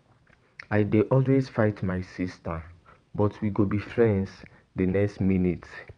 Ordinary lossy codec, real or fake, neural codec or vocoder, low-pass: MP3, 96 kbps; fake; vocoder, 22.05 kHz, 80 mel bands, WaveNeXt; 9.9 kHz